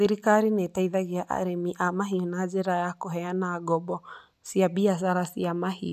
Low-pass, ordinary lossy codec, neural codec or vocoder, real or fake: 14.4 kHz; none; none; real